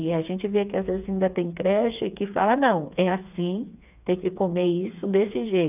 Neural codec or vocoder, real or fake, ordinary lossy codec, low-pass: codec, 16 kHz, 4 kbps, FreqCodec, smaller model; fake; none; 3.6 kHz